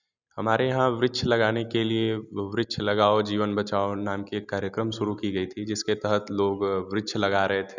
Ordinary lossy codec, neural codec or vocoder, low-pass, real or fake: none; none; 7.2 kHz; real